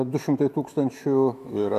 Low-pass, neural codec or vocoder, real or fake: 14.4 kHz; autoencoder, 48 kHz, 128 numbers a frame, DAC-VAE, trained on Japanese speech; fake